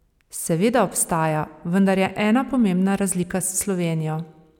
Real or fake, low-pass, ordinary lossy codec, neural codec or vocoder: real; 19.8 kHz; none; none